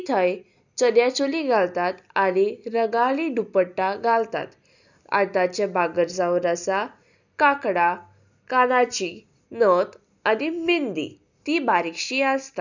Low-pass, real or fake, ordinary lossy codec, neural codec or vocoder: 7.2 kHz; real; none; none